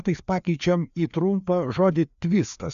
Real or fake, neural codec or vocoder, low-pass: fake; codec, 16 kHz, 2 kbps, FreqCodec, larger model; 7.2 kHz